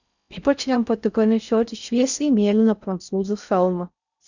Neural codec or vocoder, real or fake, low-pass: codec, 16 kHz in and 24 kHz out, 0.6 kbps, FocalCodec, streaming, 4096 codes; fake; 7.2 kHz